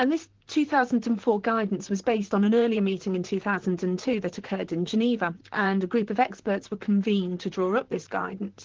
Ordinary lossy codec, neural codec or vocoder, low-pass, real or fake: Opus, 16 kbps; vocoder, 44.1 kHz, 128 mel bands, Pupu-Vocoder; 7.2 kHz; fake